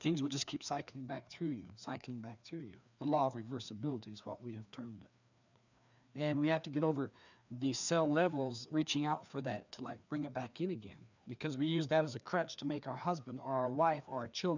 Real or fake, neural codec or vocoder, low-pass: fake; codec, 16 kHz, 2 kbps, FreqCodec, larger model; 7.2 kHz